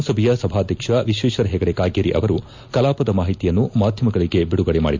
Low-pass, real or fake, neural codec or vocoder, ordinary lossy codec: 7.2 kHz; real; none; MP3, 64 kbps